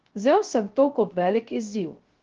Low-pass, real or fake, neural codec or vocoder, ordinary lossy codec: 7.2 kHz; fake; codec, 16 kHz, 0.3 kbps, FocalCodec; Opus, 16 kbps